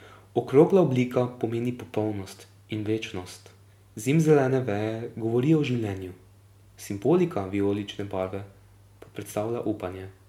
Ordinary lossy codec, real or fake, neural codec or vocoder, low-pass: MP3, 96 kbps; real; none; 19.8 kHz